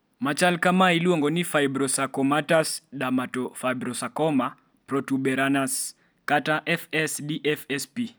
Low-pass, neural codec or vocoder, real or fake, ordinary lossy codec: none; none; real; none